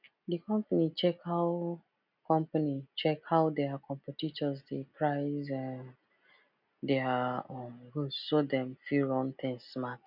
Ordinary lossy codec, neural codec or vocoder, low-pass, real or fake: none; none; 5.4 kHz; real